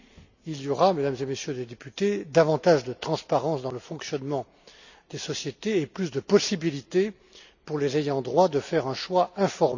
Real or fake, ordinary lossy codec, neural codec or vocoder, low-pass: real; none; none; 7.2 kHz